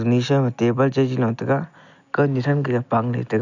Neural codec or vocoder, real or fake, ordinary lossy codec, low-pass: none; real; none; 7.2 kHz